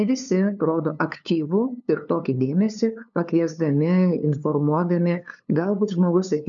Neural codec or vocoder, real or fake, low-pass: codec, 16 kHz, 2 kbps, FunCodec, trained on LibriTTS, 25 frames a second; fake; 7.2 kHz